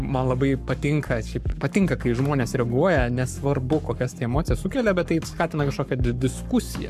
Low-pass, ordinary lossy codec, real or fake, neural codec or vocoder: 14.4 kHz; AAC, 96 kbps; fake; codec, 44.1 kHz, 7.8 kbps, Pupu-Codec